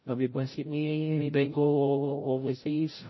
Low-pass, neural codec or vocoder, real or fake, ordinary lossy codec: 7.2 kHz; codec, 16 kHz, 0.5 kbps, FreqCodec, larger model; fake; MP3, 24 kbps